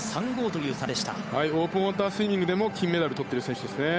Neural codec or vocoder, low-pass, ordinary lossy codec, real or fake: codec, 16 kHz, 8 kbps, FunCodec, trained on Chinese and English, 25 frames a second; none; none; fake